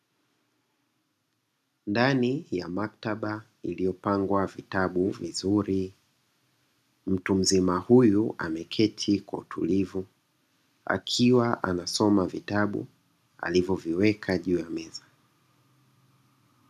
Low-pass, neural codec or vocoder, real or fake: 14.4 kHz; none; real